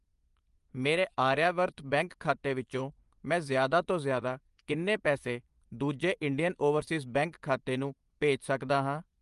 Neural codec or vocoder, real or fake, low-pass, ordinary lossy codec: vocoder, 24 kHz, 100 mel bands, Vocos; fake; 10.8 kHz; Opus, 32 kbps